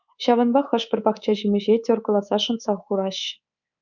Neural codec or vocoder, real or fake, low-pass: codec, 24 kHz, 3.1 kbps, DualCodec; fake; 7.2 kHz